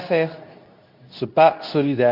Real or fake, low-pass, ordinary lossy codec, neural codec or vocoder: fake; 5.4 kHz; none; codec, 24 kHz, 0.9 kbps, WavTokenizer, medium speech release version 2